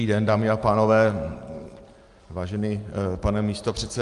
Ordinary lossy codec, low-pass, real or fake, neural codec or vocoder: Opus, 32 kbps; 10.8 kHz; real; none